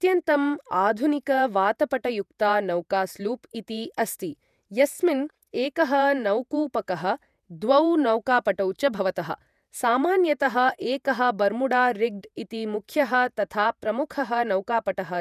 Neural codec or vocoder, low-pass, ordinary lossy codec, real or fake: vocoder, 48 kHz, 128 mel bands, Vocos; 14.4 kHz; none; fake